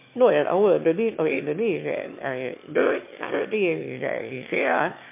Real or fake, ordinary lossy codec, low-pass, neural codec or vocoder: fake; MP3, 24 kbps; 3.6 kHz; autoencoder, 22.05 kHz, a latent of 192 numbers a frame, VITS, trained on one speaker